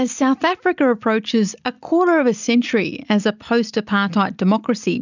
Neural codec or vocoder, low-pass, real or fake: none; 7.2 kHz; real